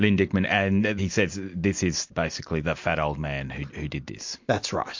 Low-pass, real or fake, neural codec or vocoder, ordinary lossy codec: 7.2 kHz; real; none; MP3, 48 kbps